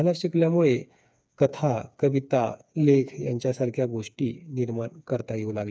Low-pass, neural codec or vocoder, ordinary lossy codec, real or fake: none; codec, 16 kHz, 4 kbps, FreqCodec, smaller model; none; fake